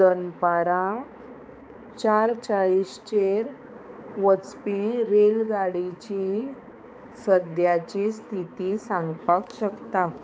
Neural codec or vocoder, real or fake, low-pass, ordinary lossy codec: codec, 16 kHz, 4 kbps, X-Codec, HuBERT features, trained on balanced general audio; fake; none; none